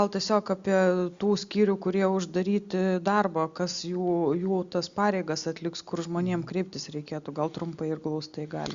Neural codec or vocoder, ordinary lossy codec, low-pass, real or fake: none; Opus, 64 kbps; 7.2 kHz; real